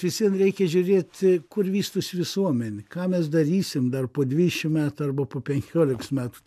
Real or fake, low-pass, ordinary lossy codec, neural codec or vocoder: real; 14.4 kHz; MP3, 96 kbps; none